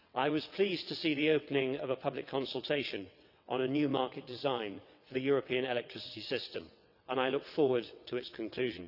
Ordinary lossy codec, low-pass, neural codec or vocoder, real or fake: none; 5.4 kHz; vocoder, 22.05 kHz, 80 mel bands, WaveNeXt; fake